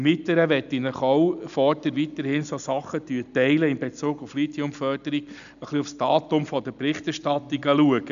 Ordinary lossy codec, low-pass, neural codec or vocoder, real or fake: none; 7.2 kHz; none; real